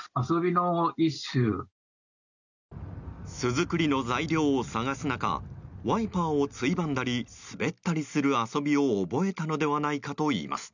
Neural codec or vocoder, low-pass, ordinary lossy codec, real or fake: none; 7.2 kHz; none; real